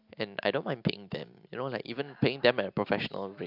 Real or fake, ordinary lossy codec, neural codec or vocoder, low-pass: real; AAC, 48 kbps; none; 5.4 kHz